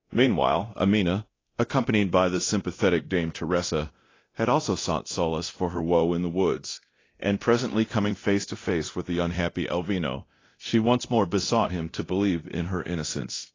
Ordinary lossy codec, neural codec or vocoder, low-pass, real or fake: AAC, 32 kbps; codec, 24 kHz, 0.9 kbps, DualCodec; 7.2 kHz; fake